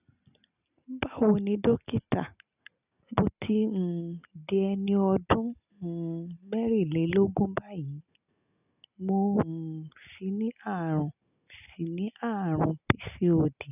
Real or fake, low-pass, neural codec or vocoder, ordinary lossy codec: real; 3.6 kHz; none; none